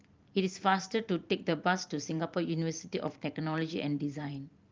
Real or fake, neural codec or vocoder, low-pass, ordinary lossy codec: fake; vocoder, 44.1 kHz, 128 mel bands every 512 samples, BigVGAN v2; 7.2 kHz; Opus, 32 kbps